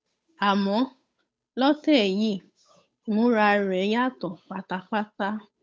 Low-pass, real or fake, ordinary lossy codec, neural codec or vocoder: none; fake; none; codec, 16 kHz, 8 kbps, FunCodec, trained on Chinese and English, 25 frames a second